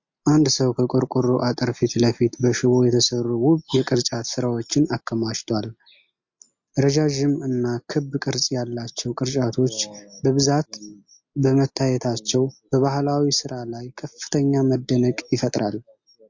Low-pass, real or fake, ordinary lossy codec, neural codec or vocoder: 7.2 kHz; real; MP3, 48 kbps; none